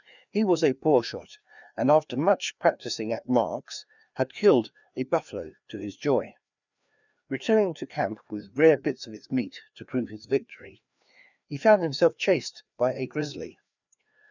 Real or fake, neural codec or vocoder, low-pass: fake; codec, 16 kHz, 2 kbps, FreqCodec, larger model; 7.2 kHz